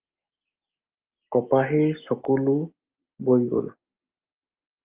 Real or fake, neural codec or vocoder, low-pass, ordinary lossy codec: real; none; 3.6 kHz; Opus, 24 kbps